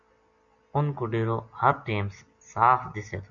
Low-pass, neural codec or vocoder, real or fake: 7.2 kHz; none; real